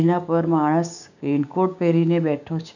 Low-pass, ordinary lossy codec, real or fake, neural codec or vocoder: 7.2 kHz; none; fake; codec, 16 kHz, 6 kbps, DAC